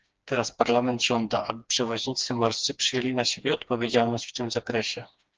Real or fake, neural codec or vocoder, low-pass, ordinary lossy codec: fake; codec, 16 kHz, 2 kbps, FreqCodec, smaller model; 7.2 kHz; Opus, 24 kbps